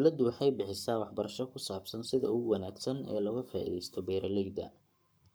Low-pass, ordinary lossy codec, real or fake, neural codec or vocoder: none; none; fake; codec, 44.1 kHz, 7.8 kbps, Pupu-Codec